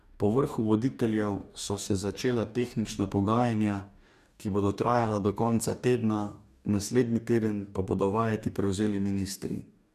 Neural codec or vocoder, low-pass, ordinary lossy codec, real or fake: codec, 44.1 kHz, 2.6 kbps, DAC; 14.4 kHz; none; fake